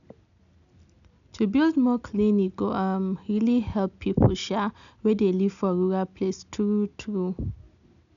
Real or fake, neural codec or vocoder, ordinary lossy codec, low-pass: real; none; none; 7.2 kHz